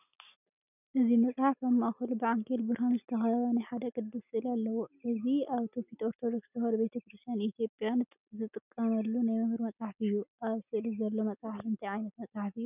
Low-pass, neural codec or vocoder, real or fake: 3.6 kHz; none; real